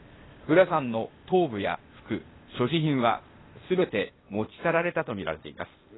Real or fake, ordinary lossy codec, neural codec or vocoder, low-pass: fake; AAC, 16 kbps; codec, 16 kHz, 0.8 kbps, ZipCodec; 7.2 kHz